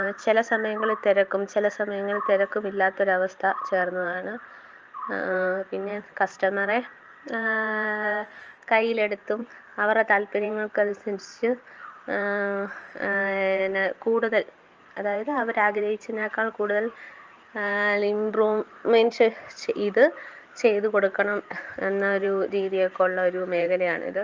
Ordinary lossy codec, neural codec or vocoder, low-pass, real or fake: Opus, 24 kbps; vocoder, 44.1 kHz, 128 mel bands every 512 samples, BigVGAN v2; 7.2 kHz; fake